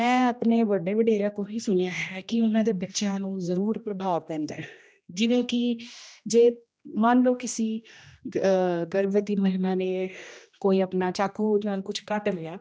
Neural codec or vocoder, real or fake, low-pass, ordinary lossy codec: codec, 16 kHz, 1 kbps, X-Codec, HuBERT features, trained on general audio; fake; none; none